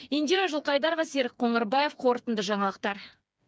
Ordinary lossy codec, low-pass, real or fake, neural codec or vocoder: none; none; fake; codec, 16 kHz, 4 kbps, FreqCodec, smaller model